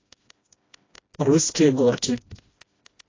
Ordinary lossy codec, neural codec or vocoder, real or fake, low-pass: AAC, 32 kbps; codec, 16 kHz, 1 kbps, FreqCodec, smaller model; fake; 7.2 kHz